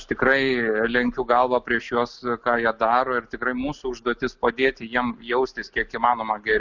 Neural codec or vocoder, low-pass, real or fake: none; 7.2 kHz; real